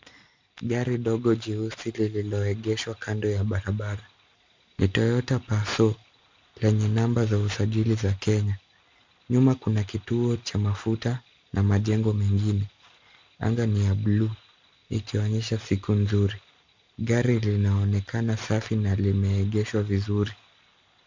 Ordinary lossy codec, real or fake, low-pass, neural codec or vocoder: MP3, 64 kbps; real; 7.2 kHz; none